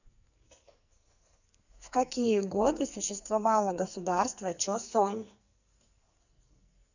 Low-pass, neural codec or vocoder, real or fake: 7.2 kHz; codec, 44.1 kHz, 2.6 kbps, SNAC; fake